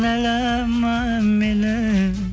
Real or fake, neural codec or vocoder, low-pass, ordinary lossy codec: real; none; none; none